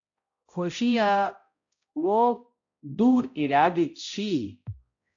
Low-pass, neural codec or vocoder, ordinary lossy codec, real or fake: 7.2 kHz; codec, 16 kHz, 0.5 kbps, X-Codec, HuBERT features, trained on balanced general audio; AAC, 48 kbps; fake